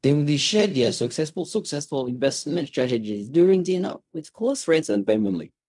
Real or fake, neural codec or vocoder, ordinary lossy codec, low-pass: fake; codec, 16 kHz in and 24 kHz out, 0.4 kbps, LongCat-Audio-Codec, fine tuned four codebook decoder; none; 10.8 kHz